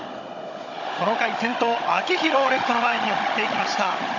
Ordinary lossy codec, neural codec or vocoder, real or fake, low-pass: none; codec, 16 kHz, 16 kbps, FunCodec, trained on Chinese and English, 50 frames a second; fake; 7.2 kHz